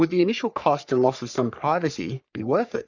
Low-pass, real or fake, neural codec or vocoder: 7.2 kHz; fake; codec, 44.1 kHz, 3.4 kbps, Pupu-Codec